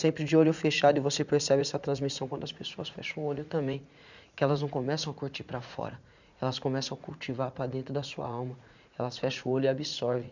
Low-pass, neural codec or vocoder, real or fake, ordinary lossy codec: 7.2 kHz; vocoder, 44.1 kHz, 80 mel bands, Vocos; fake; none